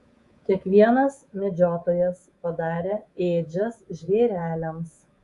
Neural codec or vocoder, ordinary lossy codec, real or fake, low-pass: codec, 24 kHz, 3.1 kbps, DualCodec; Opus, 64 kbps; fake; 10.8 kHz